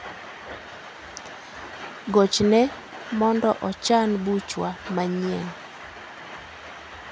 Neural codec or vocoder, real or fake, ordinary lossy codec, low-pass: none; real; none; none